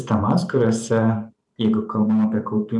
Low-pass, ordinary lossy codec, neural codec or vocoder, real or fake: 10.8 kHz; MP3, 96 kbps; vocoder, 44.1 kHz, 128 mel bands every 512 samples, BigVGAN v2; fake